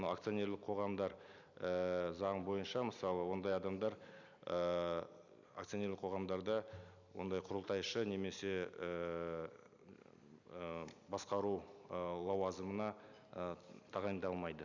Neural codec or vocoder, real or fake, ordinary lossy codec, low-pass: none; real; none; 7.2 kHz